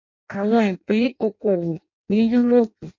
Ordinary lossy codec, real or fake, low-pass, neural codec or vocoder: MP3, 48 kbps; fake; 7.2 kHz; codec, 16 kHz in and 24 kHz out, 0.6 kbps, FireRedTTS-2 codec